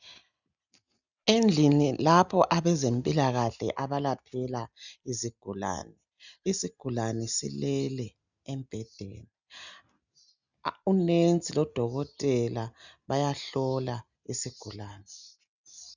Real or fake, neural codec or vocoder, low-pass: real; none; 7.2 kHz